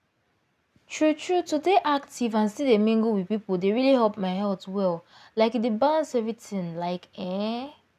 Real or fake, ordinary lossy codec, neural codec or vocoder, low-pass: real; none; none; 14.4 kHz